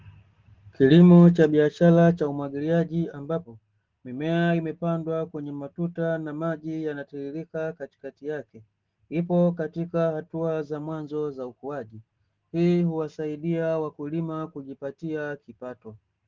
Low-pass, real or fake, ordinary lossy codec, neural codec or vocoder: 7.2 kHz; real; Opus, 16 kbps; none